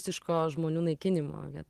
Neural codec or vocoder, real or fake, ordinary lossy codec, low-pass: none; real; Opus, 16 kbps; 14.4 kHz